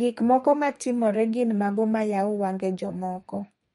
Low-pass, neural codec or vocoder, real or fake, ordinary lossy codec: 14.4 kHz; codec, 32 kHz, 1.9 kbps, SNAC; fake; MP3, 48 kbps